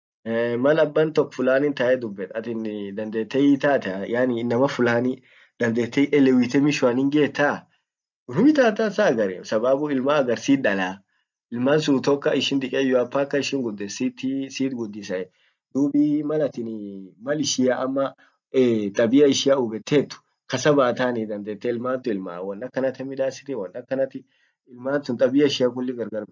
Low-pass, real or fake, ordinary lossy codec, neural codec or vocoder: 7.2 kHz; real; MP3, 64 kbps; none